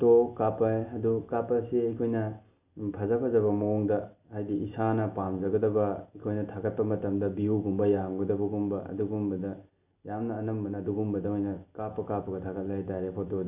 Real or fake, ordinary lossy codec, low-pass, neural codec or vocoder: real; none; 3.6 kHz; none